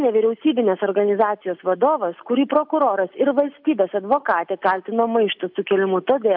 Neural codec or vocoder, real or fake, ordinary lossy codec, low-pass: none; real; AAC, 48 kbps; 5.4 kHz